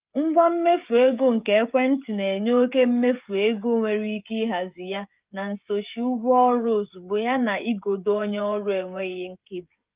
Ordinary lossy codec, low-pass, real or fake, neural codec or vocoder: Opus, 24 kbps; 3.6 kHz; fake; codec, 16 kHz, 16 kbps, FreqCodec, smaller model